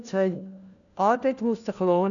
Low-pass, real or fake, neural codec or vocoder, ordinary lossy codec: 7.2 kHz; fake; codec, 16 kHz, 1 kbps, FunCodec, trained on LibriTTS, 50 frames a second; none